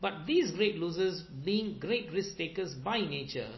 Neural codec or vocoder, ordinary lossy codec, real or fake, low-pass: none; MP3, 24 kbps; real; 7.2 kHz